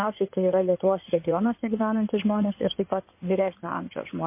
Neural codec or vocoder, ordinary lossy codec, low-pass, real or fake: codec, 16 kHz in and 24 kHz out, 2.2 kbps, FireRedTTS-2 codec; MP3, 24 kbps; 3.6 kHz; fake